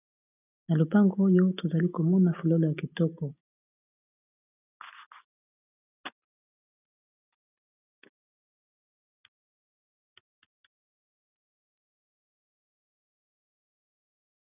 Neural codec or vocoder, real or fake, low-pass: none; real; 3.6 kHz